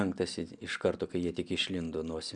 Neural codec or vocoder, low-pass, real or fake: none; 9.9 kHz; real